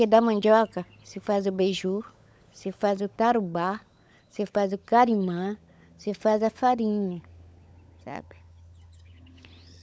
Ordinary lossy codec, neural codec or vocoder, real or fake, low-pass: none; codec, 16 kHz, 16 kbps, FunCodec, trained on LibriTTS, 50 frames a second; fake; none